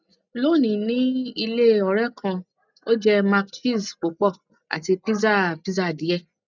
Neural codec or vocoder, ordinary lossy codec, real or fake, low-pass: none; none; real; 7.2 kHz